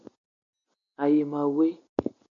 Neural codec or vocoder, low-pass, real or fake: none; 7.2 kHz; real